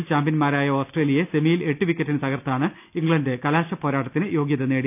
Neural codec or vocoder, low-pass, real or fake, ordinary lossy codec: none; 3.6 kHz; real; none